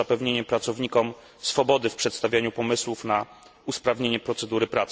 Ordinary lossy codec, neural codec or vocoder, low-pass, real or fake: none; none; none; real